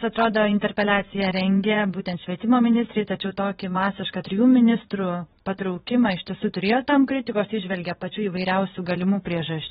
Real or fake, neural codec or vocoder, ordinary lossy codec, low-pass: fake; vocoder, 22.05 kHz, 80 mel bands, WaveNeXt; AAC, 16 kbps; 9.9 kHz